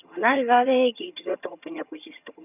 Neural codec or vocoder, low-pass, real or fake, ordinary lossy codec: vocoder, 22.05 kHz, 80 mel bands, HiFi-GAN; 3.6 kHz; fake; none